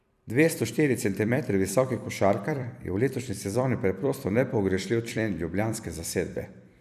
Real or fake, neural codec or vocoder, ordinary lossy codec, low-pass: fake; vocoder, 44.1 kHz, 128 mel bands every 256 samples, BigVGAN v2; none; 14.4 kHz